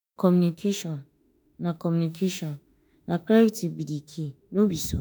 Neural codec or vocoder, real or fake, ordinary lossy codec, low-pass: autoencoder, 48 kHz, 32 numbers a frame, DAC-VAE, trained on Japanese speech; fake; none; none